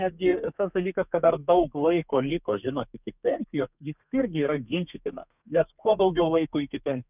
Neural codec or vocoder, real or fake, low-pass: codec, 32 kHz, 1.9 kbps, SNAC; fake; 3.6 kHz